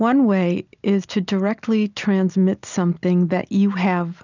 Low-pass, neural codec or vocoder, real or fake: 7.2 kHz; none; real